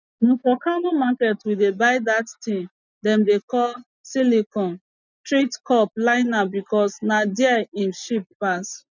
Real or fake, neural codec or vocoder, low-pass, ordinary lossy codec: real; none; 7.2 kHz; none